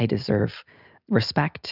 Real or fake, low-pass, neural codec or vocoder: real; 5.4 kHz; none